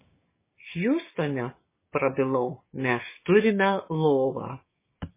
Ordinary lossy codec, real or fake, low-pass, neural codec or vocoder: MP3, 16 kbps; fake; 3.6 kHz; codec, 16 kHz, 6 kbps, DAC